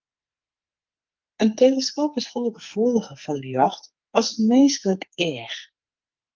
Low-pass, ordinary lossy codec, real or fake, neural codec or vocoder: 7.2 kHz; Opus, 32 kbps; fake; codec, 44.1 kHz, 2.6 kbps, SNAC